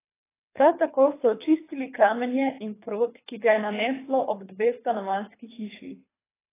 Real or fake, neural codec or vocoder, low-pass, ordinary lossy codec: fake; codec, 24 kHz, 3 kbps, HILCodec; 3.6 kHz; AAC, 16 kbps